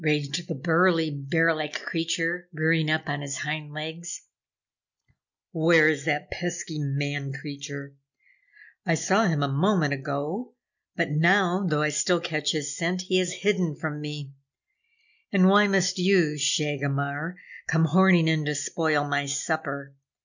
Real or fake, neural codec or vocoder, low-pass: real; none; 7.2 kHz